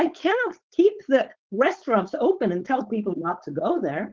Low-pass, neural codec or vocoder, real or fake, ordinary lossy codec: 7.2 kHz; codec, 16 kHz, 4.8 kbps, FACodec; fake; Opus, 16 kbps